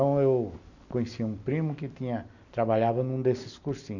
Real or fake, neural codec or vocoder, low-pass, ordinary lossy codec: real; none; 7.2 kHz; none